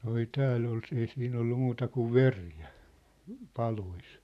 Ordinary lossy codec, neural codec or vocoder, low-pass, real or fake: none; vocoder, 44.1 kHz, 128 mel bands every 512 samples, BigVGAN v2; 14.4 kHz; fake